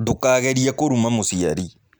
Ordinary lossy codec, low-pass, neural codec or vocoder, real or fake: none; none; none; real